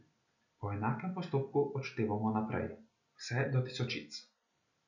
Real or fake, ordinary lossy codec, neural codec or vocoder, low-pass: real; none; none; 7.2 kHz